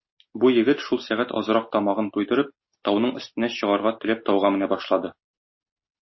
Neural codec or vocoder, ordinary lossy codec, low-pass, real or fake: none; MP3, 24 kbps; 7.2 kHz; real